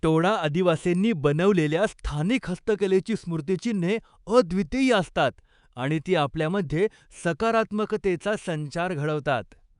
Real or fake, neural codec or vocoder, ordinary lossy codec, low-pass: fake; codec, 24 kHz, 3.1 kbps, DualCodec; none; 10.8 kHz